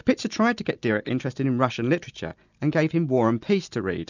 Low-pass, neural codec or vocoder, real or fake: 7.2 kHz; none; real